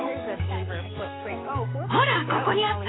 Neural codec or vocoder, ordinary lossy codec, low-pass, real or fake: codec, 16 kHz, 6 kbps, DAC; AAC, 16 kbps; 7.2 kHz; fake